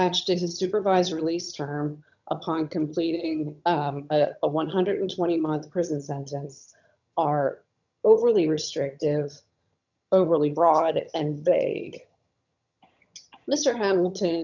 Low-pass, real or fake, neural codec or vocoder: 7.2 kHz; fake; vocoder, 22.05 kHz, 80 mel bands, HiFi-GAN